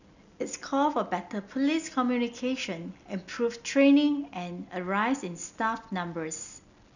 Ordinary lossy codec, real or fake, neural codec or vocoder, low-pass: none; real; none; 7.2 kHz